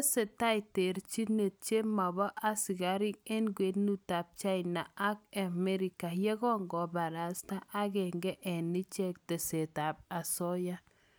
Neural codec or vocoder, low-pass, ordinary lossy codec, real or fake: none; none; none; real